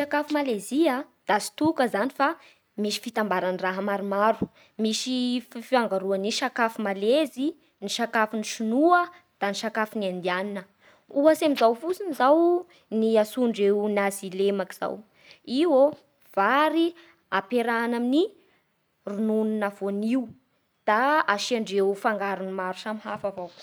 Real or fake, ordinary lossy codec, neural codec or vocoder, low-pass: real; none; none; none